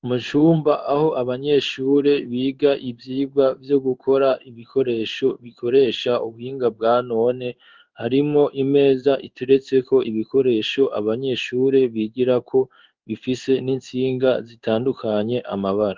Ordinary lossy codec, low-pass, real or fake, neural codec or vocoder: Opus, 32 kbps; 7.2 kHz; fake; codec, 16 kHz in and 24 kHz out, 1 kbps, XY-Tokenizer